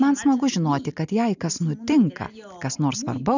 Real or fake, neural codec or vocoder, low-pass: real; none; 7.2 kHz